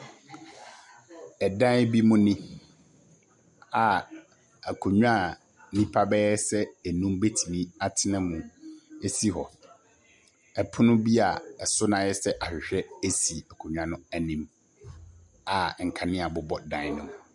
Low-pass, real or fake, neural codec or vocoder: 10.8 kHz; real; none